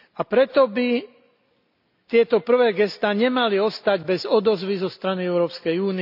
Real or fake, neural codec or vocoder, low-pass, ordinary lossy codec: real; none; 5.4 kHz; none